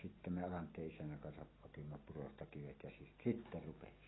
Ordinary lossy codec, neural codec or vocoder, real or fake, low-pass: AAC, 16 kbps; none; real; 7.2 kHz